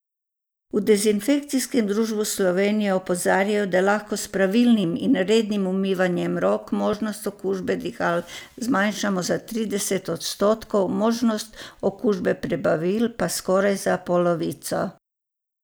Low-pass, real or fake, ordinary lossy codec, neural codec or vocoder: none; real; none; none